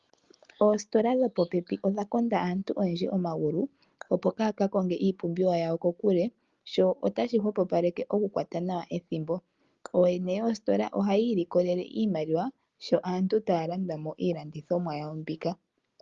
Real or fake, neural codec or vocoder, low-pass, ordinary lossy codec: real; none; 7.2 kHz; Opus, 32 kbps